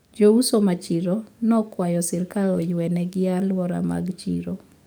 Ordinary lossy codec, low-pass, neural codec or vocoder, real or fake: none; none; codec, 44.1 kHz, 7.8 kbps, DAC; fake